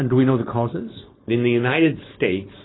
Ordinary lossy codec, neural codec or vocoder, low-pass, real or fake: AAC, 16 kbps; codec, 16 kHz, 4.8 kbps, FACodec; 7.2 kHz; fake